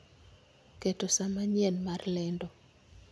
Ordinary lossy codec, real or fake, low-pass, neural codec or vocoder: none; real; none; none